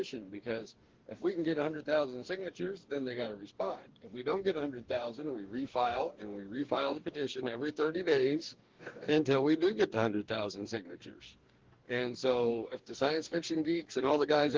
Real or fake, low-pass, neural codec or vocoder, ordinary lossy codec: fake; 7.2 kHz; codec, 44.1 kHz, 2.6 kbps, DAC; Opus, 16 kbps